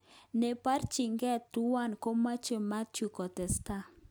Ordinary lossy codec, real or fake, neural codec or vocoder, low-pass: none; real; none; none